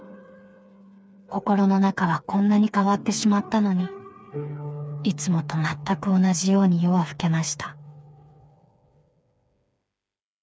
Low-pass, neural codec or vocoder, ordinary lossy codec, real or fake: none; codec, 16 kHz, 4 kbps, FreqCodec, smaller model; none; fake